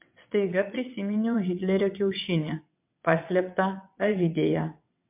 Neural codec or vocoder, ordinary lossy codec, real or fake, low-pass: vocoder, 22.05 kHz, 80 mel bands, WaveNeXt; MP3, 32 kbps; fake; 3.6 kHz